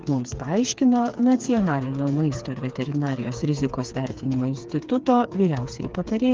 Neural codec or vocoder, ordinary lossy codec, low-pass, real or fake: codec, 16 kHz, 4 kbps, FreqCodec, smaller model; Opus, 32 kbps; 7.2 kHz; fake